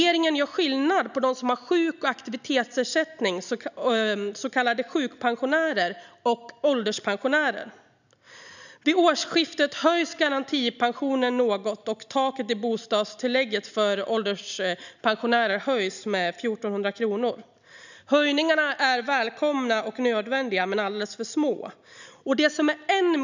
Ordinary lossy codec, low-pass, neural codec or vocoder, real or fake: none; 7.2 kHz; none; real